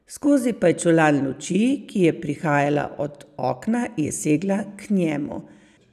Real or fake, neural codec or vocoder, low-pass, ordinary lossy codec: real; none; 14.4 kHz; none